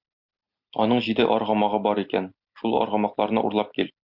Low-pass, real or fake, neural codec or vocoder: 5.4 kHz; real; none